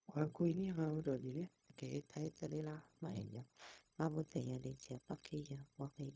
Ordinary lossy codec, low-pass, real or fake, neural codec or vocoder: none; none; fake; codec, 16 kHz, 0.4 kbps, LongCat-Audio-Codec